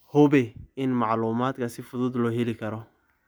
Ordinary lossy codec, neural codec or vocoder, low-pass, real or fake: none; none; none; real